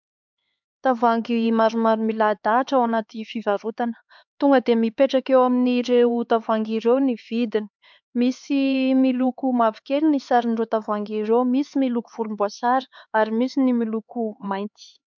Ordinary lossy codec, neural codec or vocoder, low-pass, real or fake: MP3, 64 kbps; codec, 16 kHz, 4 kbps, X-Codec, HuBERT features, trained on LibriSpeech; 7.2 kHz; fake